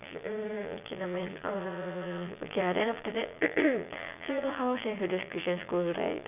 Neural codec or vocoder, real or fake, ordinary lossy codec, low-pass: vocoder, 22.05 kHz, 80 mel bands, Vocos; fake; none; 3.6 kHz